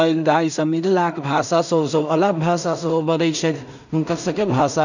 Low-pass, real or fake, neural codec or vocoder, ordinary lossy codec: 7.2 kHz; fake; codec, 16 kHz in and 24 kHz out, 0.4 kbps, LongCat-Audio-Codec, two codebook decoder; none